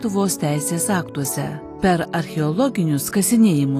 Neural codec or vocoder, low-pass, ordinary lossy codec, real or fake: none; 14.4 kHz; AAC, 48 kbps; real